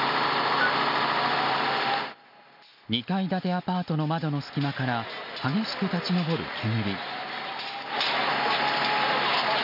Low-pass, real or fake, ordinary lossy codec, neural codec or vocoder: 5.4 kHz; real; none; none